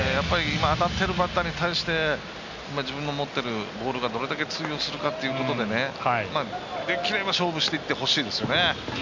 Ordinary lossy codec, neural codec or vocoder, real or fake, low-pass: none; none; real; 7.2 kHz